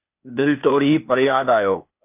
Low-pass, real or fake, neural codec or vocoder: 3.6 kHz; fake; codec, 16 kHz, 0.8 kbps, ZipCodec